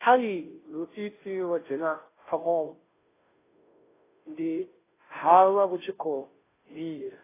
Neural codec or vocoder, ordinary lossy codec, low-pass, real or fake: codec, 16 kHz, 0.5 kbps, FunCodec, trained on Chinese and English, 25 frames a second; AAC, 16 kbps; 3.6 kHz; fake